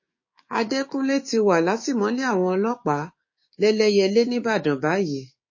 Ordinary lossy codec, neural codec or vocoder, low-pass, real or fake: MP3, 32 kbps; codec, 16 kHz, 6 kbps, DAC; 7.2 kHz; fake